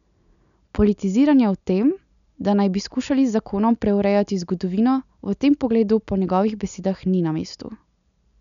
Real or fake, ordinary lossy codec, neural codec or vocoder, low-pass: real; none; none; 7.2 kHz